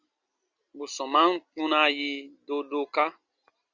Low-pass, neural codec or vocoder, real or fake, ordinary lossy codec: 7.2 kHz; none; real; Opus, 64 kbps